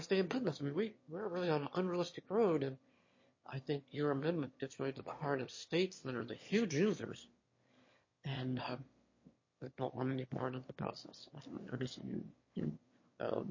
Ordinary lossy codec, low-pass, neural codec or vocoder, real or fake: MP3, 32 kbps; 7.2 kHz; autoencoder, 22.05 kHz, a latent of 192 numbers a frame, VITS, trained on one speaker; fake